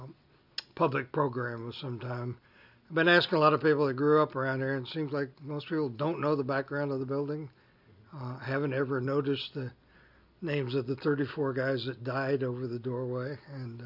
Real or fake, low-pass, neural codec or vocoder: real; 5.4 kHz; none